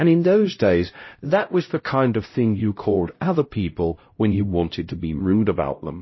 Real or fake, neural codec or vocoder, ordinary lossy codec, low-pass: fake; codec, 16 kHz, 0.5 kbps, X-Codec, HuBERT features, trained on LibriSpeech; MP3, 24 kbps; 7.2 kHz